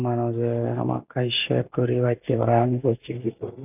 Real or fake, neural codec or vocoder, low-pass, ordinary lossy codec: real; none; 3.6 kHz; none